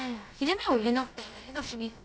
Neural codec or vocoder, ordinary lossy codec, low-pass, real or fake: codec, 16 kHz, about 1 kbps, DyCAST, with the encoder's durations; none; none; fake